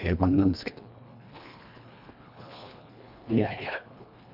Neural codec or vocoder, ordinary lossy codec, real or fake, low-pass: codec, 24 kHz, 1.5 kbps, HILCodec; none; fake; 5.4 kHz